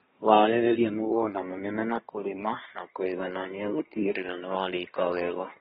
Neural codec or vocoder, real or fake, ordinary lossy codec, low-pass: codec, 24 kHz, 1 kbps, SNAC; fake; AAC, 16 kbps; 10.8 kHz